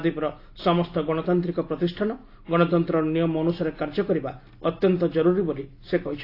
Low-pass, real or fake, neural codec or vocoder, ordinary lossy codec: 5.4 kHz; real; none; AAC, 32 kbps